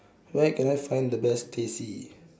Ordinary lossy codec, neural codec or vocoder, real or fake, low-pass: none; none; real; none